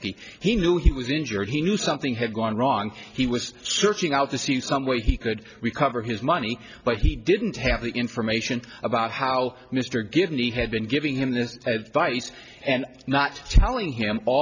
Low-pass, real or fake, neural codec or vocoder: 7.2 kHz; real; none